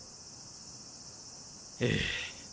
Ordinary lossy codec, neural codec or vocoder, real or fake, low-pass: none; none; real; none